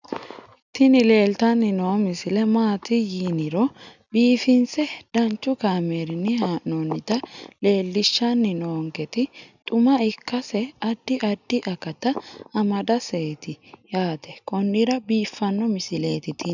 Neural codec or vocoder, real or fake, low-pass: none; real; 7.2 kHz